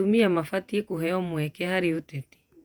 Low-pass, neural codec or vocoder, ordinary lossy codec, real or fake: 19.8 kHz; vocoder, 48 kHz, 128 mel bands, Vocos; none; fake